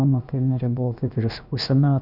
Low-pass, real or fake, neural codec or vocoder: 5.4 kHz; fake; codec, 16 kHz, about 1 kbps, DyCAST, with the encoder's durations